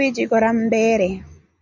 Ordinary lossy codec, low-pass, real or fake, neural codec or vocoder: MP3, 64 kbps; 7.2 kHz; real; none